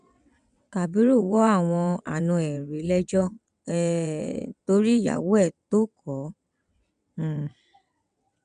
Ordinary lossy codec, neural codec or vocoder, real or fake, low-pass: none; vocoder, 22.05 kHz, 80 mel bands, WaveNeXt; fake; 9.9 kHz